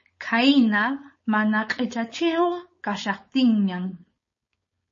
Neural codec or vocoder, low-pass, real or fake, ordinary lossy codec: codec, 16 kHz, 4.8 kbps, FACodec; 7.2 kHz; fake; MP3, 32 kbps